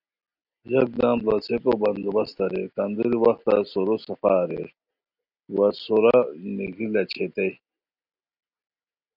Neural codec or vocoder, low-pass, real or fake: none; 5.4 kHz; real